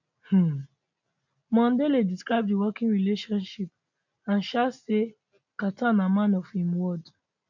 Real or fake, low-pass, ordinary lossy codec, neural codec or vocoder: real; 7.2 kHz; none; none